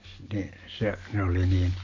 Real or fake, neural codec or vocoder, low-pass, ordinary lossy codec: real; none; 7.2 kHz; MP3, 48 kbps